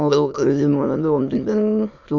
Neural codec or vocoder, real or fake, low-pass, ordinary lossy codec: autoencoder, 22.05 kHz, a latent of 192 numbers a frame, VITS, trained on many speakers; fake; 7.2 kHz; MP3, 64 kbps